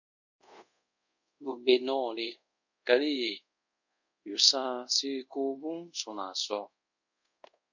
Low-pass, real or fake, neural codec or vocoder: 7.2 kHz; fake; codec, 24 kHz, 0.5 kbps, DualCodec